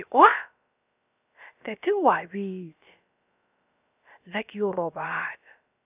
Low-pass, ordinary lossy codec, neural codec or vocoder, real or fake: 3.6 kHz; none; codec, 16 kHz, about 1 kbps, DyCAST, with the encoder's durations; fake